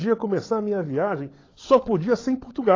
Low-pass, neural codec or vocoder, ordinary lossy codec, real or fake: 7.2 kHz; codec, 16 kHz, 16 kbps, FunCodec, trained on LibriTTS, 50 frames a second; AAC, 32 kbps; fake